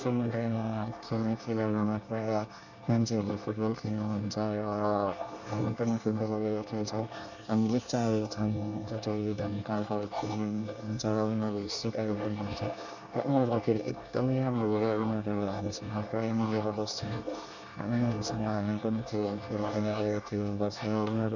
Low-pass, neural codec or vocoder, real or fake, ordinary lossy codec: 7.2 kHz; codec, 24 kHz, 1 kbps, SNAC; fake; none